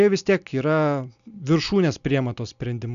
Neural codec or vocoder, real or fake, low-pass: none; real; 7.2 kHz